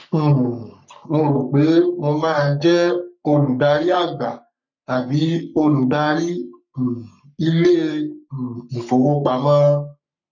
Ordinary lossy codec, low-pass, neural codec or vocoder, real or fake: none; 7.2 kHz; codec, 44.1 kHz, 3.4 kbps, Pupu-Codec; fake